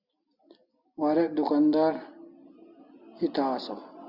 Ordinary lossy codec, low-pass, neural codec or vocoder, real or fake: Opus, 64 kbps; 5.4 kHz; none; real